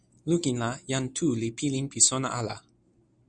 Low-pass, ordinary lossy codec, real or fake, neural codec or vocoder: 9.9 kHz; MP3, 96 kbps; real; none